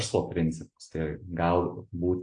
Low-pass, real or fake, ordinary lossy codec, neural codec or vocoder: 9.9 kHz; real; AAC, 48 kbps; none